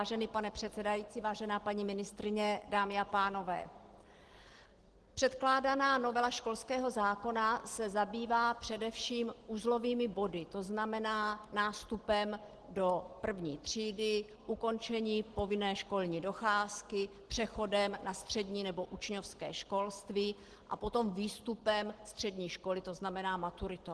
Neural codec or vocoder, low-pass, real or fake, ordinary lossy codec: none; 10.8 kHz; real; Opus, 16 kbps